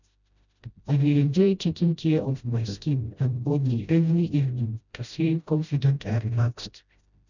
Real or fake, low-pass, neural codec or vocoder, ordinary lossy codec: fake; 7.2 kHz; codec, 16 kHz, 0.5 kbps, FreqCodec, smaller model; none